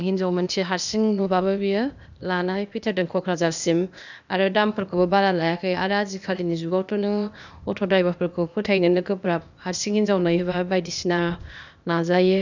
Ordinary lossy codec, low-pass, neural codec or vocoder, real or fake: none; 7.2 kHz; codec, 16 kHz, 0.8 kbps, ZipCodec; fake